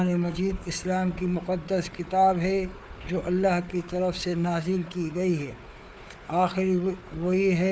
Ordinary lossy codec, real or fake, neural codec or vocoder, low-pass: none; fake; codec, 16 kHz, 4 kbps, FunCodec, trained on Chinese and English, 50 frames a second; none